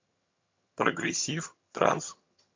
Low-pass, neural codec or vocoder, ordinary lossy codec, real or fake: 7.2 kHz; vocoder, 22.05 kHz, 80 mel bands, HiFi-GAN; MP3, 64 kbps; fake